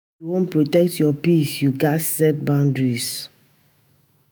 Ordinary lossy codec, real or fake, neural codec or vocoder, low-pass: none; fake; autoencoder, 48 kHz, 128 numbers a frame, DAC-VAE, trained on Japanese speech; none